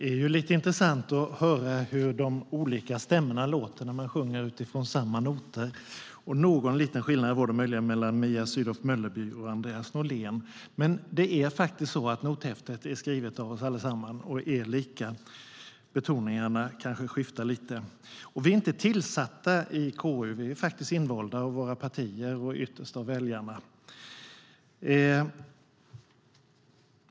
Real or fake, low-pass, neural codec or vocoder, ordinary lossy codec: real; none; none; none